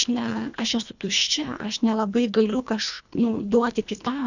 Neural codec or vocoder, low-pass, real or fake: codec, 24 kHz, 1.5 kbps, HILCodec; 7.2 kHz; fake